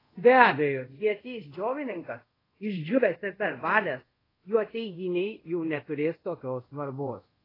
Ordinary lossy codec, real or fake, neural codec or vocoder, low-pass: AAC, 24 kbps; fake; codec, 24 kHz, 0.5 kbps, DualCodec; 5.4 kHz